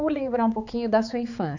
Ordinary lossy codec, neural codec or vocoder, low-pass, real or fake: none; codec, 16 kHz, 4 kbps, X-Codec, HuBERT features, trained on balanced general audio; 7.2 kHz; fake